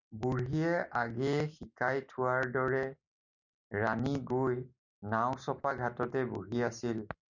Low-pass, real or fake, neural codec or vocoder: 7.2 kHz; real; none